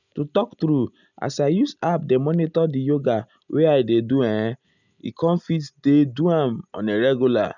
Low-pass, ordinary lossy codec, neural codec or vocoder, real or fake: 7.2 kHz; none; none; real